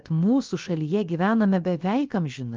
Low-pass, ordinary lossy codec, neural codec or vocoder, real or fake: 7.2 kHz; Opus, 32 kbps; codec, 16 kHz, about 1 kbps, DyCAST, with the encoder's durations; fake